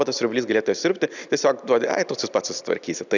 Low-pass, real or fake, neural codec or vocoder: 7.2 kHz; real; none